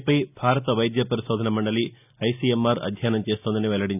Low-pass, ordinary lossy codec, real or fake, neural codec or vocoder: 3.6 kHz; none; real; none